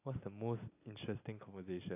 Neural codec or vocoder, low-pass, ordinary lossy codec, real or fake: none; 3.6 kHz; AAC, 32 kbps; real